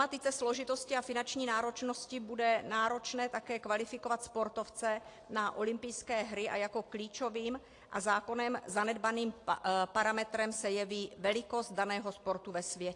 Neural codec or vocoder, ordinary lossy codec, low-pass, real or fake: none; AAC, 48 kbps; 10.8 kHz; real